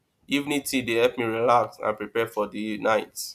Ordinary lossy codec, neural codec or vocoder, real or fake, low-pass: none; none; real; 14.4 kHz